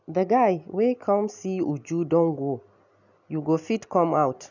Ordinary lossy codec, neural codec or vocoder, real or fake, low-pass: none; none; real; 7.2 kHz